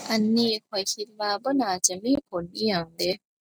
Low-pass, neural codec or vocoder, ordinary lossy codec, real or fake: none; none; none; real